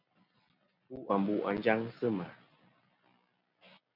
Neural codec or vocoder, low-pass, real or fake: none; 5.4 kHz; real